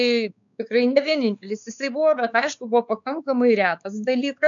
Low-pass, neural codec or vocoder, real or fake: 7.2 kHz; codec, 16 kHz, 4 kbps, X-Codec, WavLM features, trained on Multilingual LibriSpeech; fake